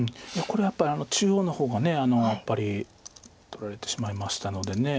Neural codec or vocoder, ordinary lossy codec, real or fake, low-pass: none; none; real; none